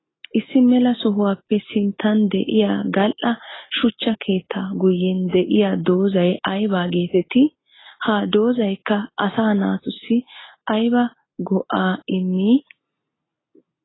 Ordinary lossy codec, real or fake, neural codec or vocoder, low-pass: AAC, 16 kbps; real; none; 7.2 kHz